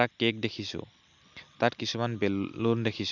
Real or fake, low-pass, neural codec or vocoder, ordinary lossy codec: real; 7.2 kHz; none; none